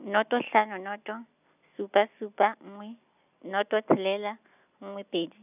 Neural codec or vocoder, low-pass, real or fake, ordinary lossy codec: none; 3.6 kHz; real; none